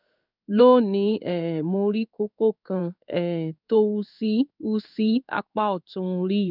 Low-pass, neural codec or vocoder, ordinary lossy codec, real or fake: 5.4 kHz; codec, 16 kHz in and 24 kHz out, 1 kbps, XY-Tokenizer; none; fake